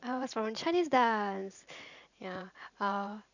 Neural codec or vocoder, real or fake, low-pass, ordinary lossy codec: vocoder, 44.1 kHz, 128 mel bands every 512 samples, BigVGAN v2; fake; 7.2 kHz; none